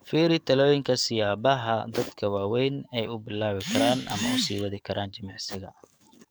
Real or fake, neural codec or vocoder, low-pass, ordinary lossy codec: fake; codec, 44.1 kHz, 7.8 kbps, DAC; none; none